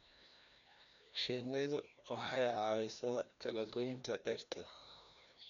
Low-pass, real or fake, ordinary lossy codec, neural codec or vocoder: 7.2 kHz; fake; none; codec, 16 kHz, 1 kbps, FreqCodec, larger model